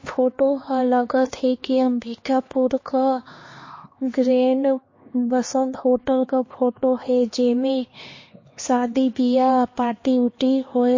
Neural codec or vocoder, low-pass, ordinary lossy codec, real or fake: codec, 16 kHz, 2 kbps, X-Codec, HuBERT features, trained on LibriSpeech; 7.2 kHz; MP3, 32 kbps; fake